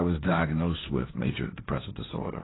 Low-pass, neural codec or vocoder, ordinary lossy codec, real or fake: 7.2 kHz; codec, 16 kHz, 1.1 kbps, Voila-Tokenizer; AAC, 16 kbps; fake